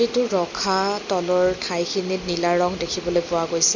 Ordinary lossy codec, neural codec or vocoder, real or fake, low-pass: MP3, 64 kbps; none; real; 7.2 kHz